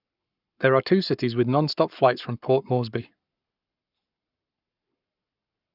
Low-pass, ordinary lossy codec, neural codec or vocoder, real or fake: 5.4 kHz; none; codec, 44.1 kHz, 7.8 kbps, Pupu-Codec; fake